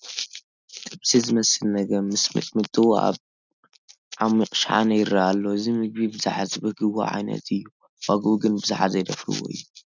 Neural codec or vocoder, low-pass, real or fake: none; 7.2 kHz; real